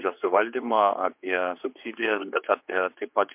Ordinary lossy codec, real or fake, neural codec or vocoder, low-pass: MP3, 32 kbps; fake; codec, 16 kHz, 4 kbps, X-Codec, HuBERT features, trained on general audio; 3.6 kHz